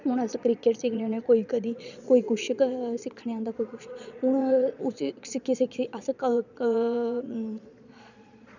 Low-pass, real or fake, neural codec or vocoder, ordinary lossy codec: 7.2 kHz; fake; vocoder, 22.05 kHz, 80 mel bands, Vocos; none